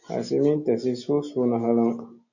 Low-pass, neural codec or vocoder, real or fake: 7.2 kHz; none; real